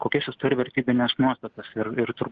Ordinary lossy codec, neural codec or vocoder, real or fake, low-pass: Opus, 24 kbps; none; real; 7.2 kHz